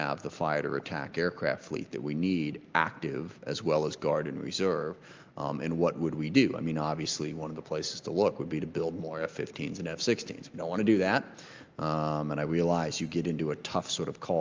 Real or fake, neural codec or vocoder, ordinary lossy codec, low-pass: real; none; Opus, 32 kbps; 7.2 kHz